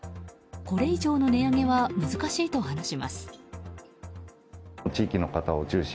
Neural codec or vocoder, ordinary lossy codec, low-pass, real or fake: none; none; none; real